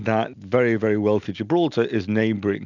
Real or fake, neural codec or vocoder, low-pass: real; none; 7.2 kHz